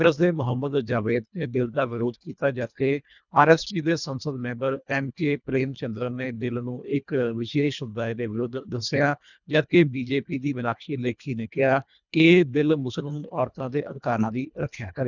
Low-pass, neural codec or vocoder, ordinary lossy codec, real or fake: 7.2 kHz; codec, 24 kHz, 1.5 kbps, HILCodec; none; fake